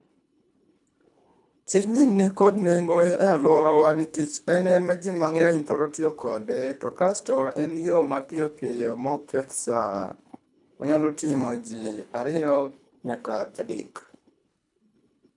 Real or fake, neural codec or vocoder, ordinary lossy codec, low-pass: fake; codec, 24 kHz, 1.5 kbps, HILCodec; none; 10.8 kHz